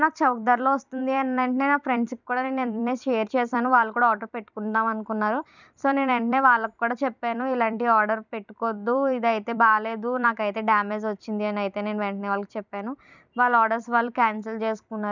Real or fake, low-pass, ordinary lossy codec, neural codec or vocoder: fake; 7.2 kHz; none; vocoder, 44.1 kHz, 128 mel bands every 256 samples, BigVGAN v2